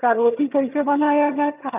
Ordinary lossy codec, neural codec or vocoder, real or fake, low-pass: none; vocoder, 22.05 kHz, 80 mel bands, HiFi-GAN; fake; 3.6 kHz